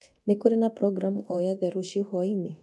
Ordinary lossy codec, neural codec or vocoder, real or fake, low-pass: none; codec, 24 kHz, 0.9 kbps, DualCodec; fake; none